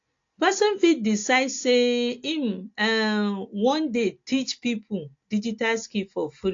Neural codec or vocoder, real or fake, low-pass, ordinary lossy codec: none; real; 7.2 kHz; AAC, 48 kbps